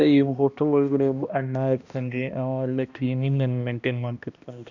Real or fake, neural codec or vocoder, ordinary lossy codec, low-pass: fake; codec, 16 kHz, 1 kbps, X-Codec, HuBERT features, trained on balanced general audio; none; 7.2 kHz